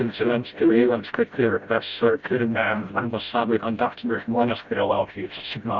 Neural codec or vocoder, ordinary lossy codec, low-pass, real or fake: codec, 16 kHz, 0.5 kbps, FreqCodec, smaller model; Opus, 64 kbps; 7.2 kHz; fake